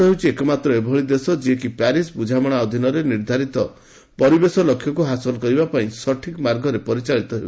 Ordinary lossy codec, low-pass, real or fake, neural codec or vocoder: none; none; real; none